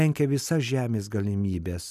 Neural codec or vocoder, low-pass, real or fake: none; 14.4 kHz; real